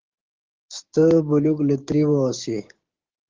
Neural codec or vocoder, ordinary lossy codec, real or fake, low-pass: codec, 16 kHz, 6 kbps, DAC; Opus, 16 kbps; fake; 7.2 kHz